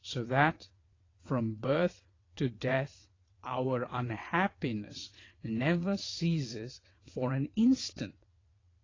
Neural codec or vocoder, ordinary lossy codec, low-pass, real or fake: vocoder, 22.05 kHz, 80 mel bands, WaveNeXt; AAC, 32 kbps; 7.2 kHz; fake